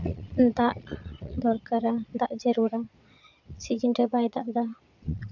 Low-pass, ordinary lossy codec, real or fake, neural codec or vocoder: 7.2 kHz; none; fake; vocoder, 22.05 kHz, 80 mel bands, Vocos